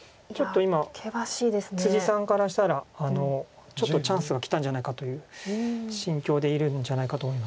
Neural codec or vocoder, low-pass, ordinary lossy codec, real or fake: none; none; none; real